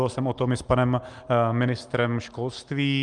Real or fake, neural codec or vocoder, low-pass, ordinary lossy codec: real; none; 9.9 kHz; Opus, 24 kbps